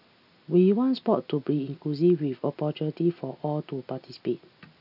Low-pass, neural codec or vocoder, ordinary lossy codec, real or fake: 5.4 kHz; none; none; real